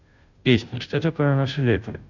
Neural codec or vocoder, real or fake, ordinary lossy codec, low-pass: codec, 16 kHz, 0.5 kbps, FunCodec, trained on Chinese and English, 25 frames a second; fake; none; 7.2 kHz